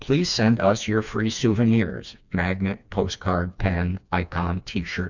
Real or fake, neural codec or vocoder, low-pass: fake; codec, 16 kHz, 2 kbps, FreqCodec, smaller model; 7.2 kHz